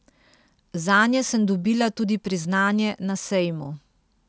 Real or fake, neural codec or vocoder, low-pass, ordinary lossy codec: real; none; none; none